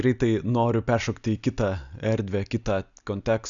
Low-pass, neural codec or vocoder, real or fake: 7.2 kHz; none; real